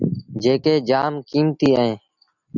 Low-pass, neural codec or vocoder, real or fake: 7.2 kHz; none; real